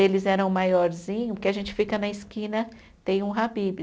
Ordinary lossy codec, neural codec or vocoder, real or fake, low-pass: none; none; real; none